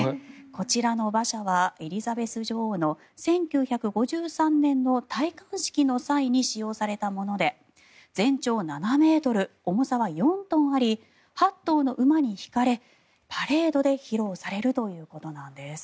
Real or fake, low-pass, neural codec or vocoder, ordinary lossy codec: real; none; none; none